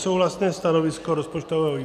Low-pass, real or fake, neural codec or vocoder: 14.4 kHz; real; none